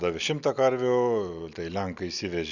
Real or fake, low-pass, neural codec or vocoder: real; 7.2 kHz; none